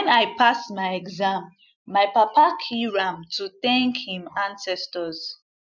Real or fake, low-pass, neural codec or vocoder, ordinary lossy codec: real; 7.2 kHz; none; none